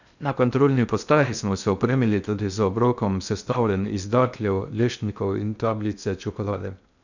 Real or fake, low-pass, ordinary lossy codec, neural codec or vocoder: fake; 7.2 kHz; none; codec, 16 kHz in and 24 kHz out, 0.6 kbps, FocalCodec, streaming, 2048 codes